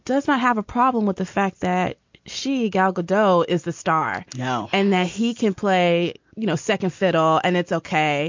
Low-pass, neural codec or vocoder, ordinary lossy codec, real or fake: 7.2 kHz; none; MP3, 48 kbps; real